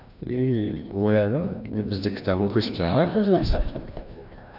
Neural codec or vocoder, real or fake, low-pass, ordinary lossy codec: codec, 16 kHz, 1 kbps, FreqCodec, larger model; fake; 5.4 kHz; none